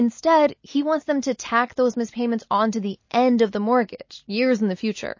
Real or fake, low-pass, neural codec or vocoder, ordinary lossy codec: real; 7.2 kHz; none; MP3, 32 kbps